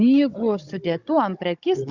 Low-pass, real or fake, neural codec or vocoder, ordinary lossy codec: 7.2 kHz; fake; codec, 16 kHz, 16 kbps, FunCodec, trained on Chinese and English, 50 frames a second; Opus, 64 kbps